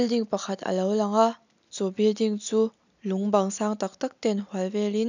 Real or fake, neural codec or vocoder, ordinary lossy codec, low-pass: real; none; none; 7.2 kHz